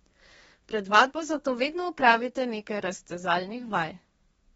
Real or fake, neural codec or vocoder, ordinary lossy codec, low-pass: fake; codec, 32 kHz, 1.9 kbps, SNAC; AAC, 24 kbps; 14.4 kHz